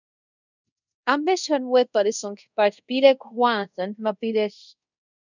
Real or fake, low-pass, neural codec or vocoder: fake; 7.2 kHz; codec, 24 kHz, 0.5 kbps, DualCodec